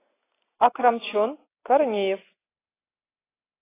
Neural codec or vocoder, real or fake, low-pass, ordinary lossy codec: none; real; 3.6 kHz; AAC, 16 kbps